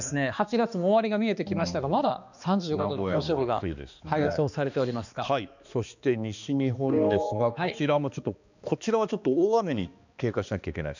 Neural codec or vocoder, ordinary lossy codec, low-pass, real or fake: codec, 16 kHz, 2 kbps, X-Codec, HuBERT features, trained on balanced general audio; none; 7.2 kHz; fake